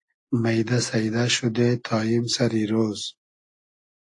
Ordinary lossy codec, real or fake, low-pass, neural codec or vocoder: AAC, 48 kbps; real; 10.8 kHz; none